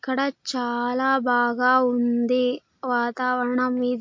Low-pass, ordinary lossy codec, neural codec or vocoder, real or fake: 7.2 kHz; MP3, 48 kbps; none; real